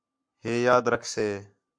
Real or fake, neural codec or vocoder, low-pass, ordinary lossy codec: fake; codec, 44.1 kHz, 7.8 kbps, Pupu-Codec; 9.9 kHz; MP3, 64 kbps